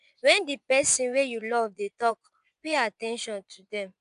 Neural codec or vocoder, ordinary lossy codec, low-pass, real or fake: vocoder, 24 kHz, 100 mel bands, Vocos; none; 10.8 kHz; fake